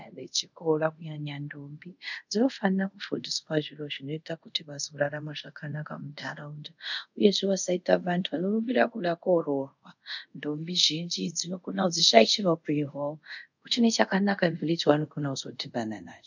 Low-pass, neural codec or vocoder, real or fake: 7.2 kHz; codec, 24 kHz, 0.5 kbps, DualCodec; fake